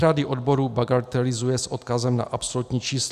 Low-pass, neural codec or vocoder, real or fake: 14.4 kHz; none; real